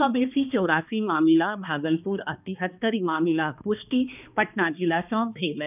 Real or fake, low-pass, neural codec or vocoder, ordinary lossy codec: fake; 3.6 kHz; codec, 16 kHz, 2 kbps, X-Codec, HuBERT features, trained on balanced general audio; none